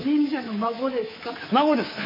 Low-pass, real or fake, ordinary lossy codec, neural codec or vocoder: 5.4 kHz; fake; MP3, 24 kbps; codec, 24 kHz, 3.1 kbps, DualCodec